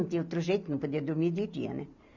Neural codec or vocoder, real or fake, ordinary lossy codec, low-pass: none; real; none; 7.2 kHz